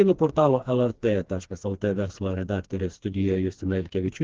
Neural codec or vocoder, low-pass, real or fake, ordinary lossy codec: codec, 16 kHz, 2 kbps, FreqCodec, smaller model; 7.2 kHz; fake; Opus, 16 kbps